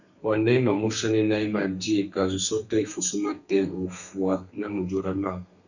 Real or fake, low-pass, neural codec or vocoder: fake; 7.2 kHz; codec, 32 kHz, 1.9 kbps, SNAC